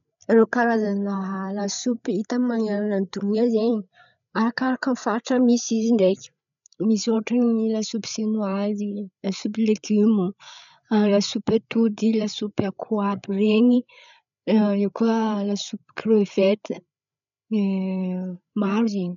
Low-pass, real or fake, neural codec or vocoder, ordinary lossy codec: 7.2 kHz; fake; codec, 16 kHz, 8 kbps, FreqCodec, larger model; none